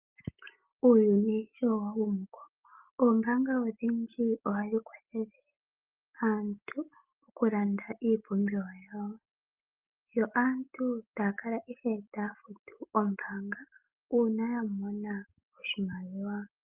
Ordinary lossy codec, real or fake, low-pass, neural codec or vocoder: Opus, 24 kbps; real; 3.6 kHz; none